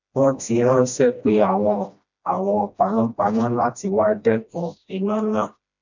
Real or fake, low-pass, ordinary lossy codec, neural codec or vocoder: fake; 7.2 kHz; none; codec, 16 kHz, 1 kbps, FreqCodec, smaller model